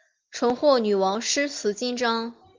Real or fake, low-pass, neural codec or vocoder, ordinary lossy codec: real; 7.2 kHz; none; Opus, 32 kbps